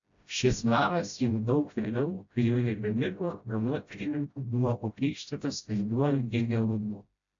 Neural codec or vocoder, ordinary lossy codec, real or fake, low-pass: codec, 16 kHz, 0.5 kbps, FreqCodec, smaller model; AAC, 64 kbps; fake; 7.2 kHz